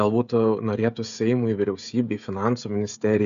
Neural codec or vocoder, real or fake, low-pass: codec, 16 kHz, 16 kbps, FreqCodec, smaller model; fake; 7.2 kHz